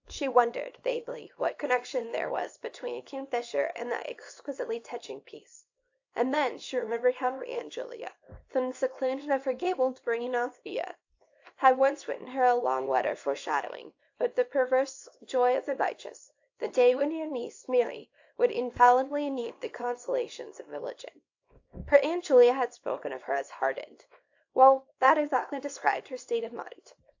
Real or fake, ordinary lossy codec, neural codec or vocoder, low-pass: fake; AAC, 48 kbps; codec, 24 kHz, 0.9 kbps, WavTokenizer, small release; 7.2 kHz